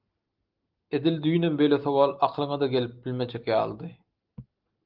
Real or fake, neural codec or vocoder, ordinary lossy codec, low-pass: real; none; Opus, 24 kbps; 5.4 kHz